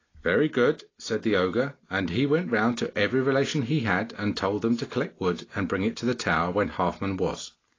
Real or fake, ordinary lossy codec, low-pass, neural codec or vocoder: real; AAC, 32 kbps; 7.2 kHz; none